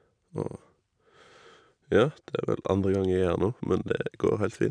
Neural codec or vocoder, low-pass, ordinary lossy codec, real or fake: none; 9.9 kHz; none; real